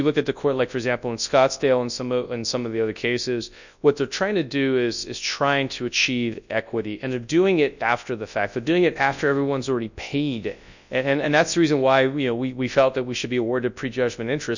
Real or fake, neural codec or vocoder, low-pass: fake; codec, 24 kHz, 0.9 kbps, WavTokenizer, large speech release; 7.2 kHz